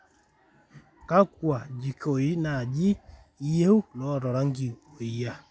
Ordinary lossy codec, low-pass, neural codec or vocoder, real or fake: none; none; none; real